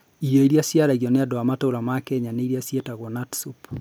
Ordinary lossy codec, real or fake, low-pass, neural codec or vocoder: none; real; none; none